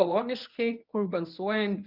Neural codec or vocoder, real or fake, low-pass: codec, 24 kHz, 0.9 kbps, WavTokenizer, medium speech release version 1; fake; 5.4 kHz